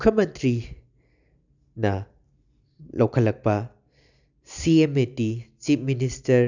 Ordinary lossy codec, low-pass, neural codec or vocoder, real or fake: none; 7.2 kHz; none; real